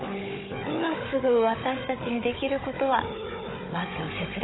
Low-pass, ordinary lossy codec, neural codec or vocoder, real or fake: 7.2 kHz; AAC, 16 kbps; codec, 16 kHz, 16 kbps, FunCodec, trained on Chinese and English, 50 frames a second; fake